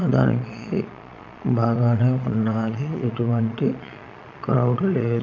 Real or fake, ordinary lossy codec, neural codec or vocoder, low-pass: fake; none; vocoder, 22.05 kHz, 80 mel bands, Vocos; 7.2 kHz